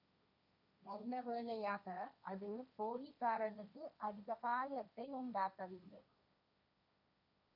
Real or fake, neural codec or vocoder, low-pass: fake; codec, 16 kHz, 1.1 kbps, Voila-Tokenizer; 5.4 kHz